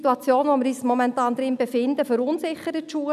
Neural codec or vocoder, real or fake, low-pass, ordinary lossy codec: none; real; 14.4 kHz; none